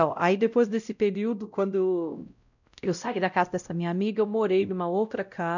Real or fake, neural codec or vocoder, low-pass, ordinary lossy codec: fake; codec, 16 kHz, 0.5 kbps, X-Codec, WavLM features, trained on Multilingual LibriSpeech; 7.2 kHz; none